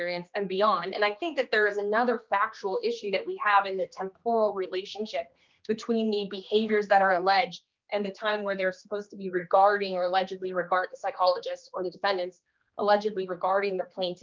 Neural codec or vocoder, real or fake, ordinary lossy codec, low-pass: codec, 16 kHz, 2 kbps, X-Codec, HuBERT features, trained on general audio; fake; Opus, 24 kbps; 7.2 kHz